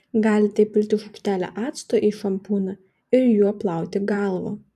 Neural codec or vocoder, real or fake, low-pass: vocoder, 44.1 kHz, 128 mel bands every 512 samples, BigVGAN v2; fake; 14.4 kHz